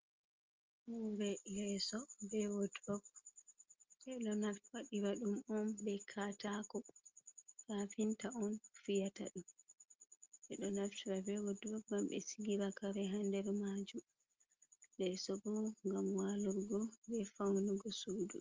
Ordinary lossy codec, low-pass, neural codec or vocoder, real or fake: Opus, 32 kbps; 7.2 kHz; none; real